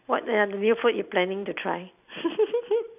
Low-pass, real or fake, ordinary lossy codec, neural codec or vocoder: 3.6 kHz; real; none; none